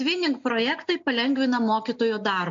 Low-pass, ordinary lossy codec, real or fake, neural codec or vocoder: 7.2 kHz; AAC, 64 kbps; real; none